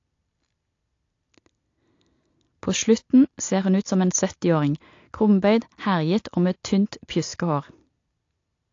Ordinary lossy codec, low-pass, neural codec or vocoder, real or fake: AAC, 48 kbps; 7.2 kHz; none; real